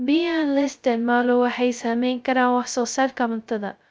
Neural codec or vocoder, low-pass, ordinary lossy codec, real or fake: codec, 16 kHz, 0.2 kbps, FocalCodec; none; none; fake